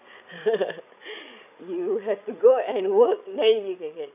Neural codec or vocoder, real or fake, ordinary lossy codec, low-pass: codec, 16 kHz, 16 kbps, FreqCodec, larger model; fake; none; 3.6 kHz